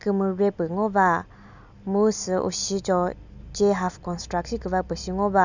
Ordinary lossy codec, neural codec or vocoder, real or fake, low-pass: none; none; real; 7.2 kHz